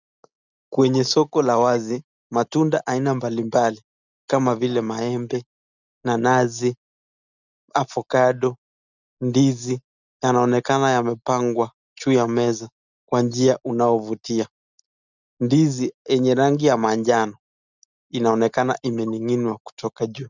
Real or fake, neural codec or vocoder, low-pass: real; none; 7.2 kHz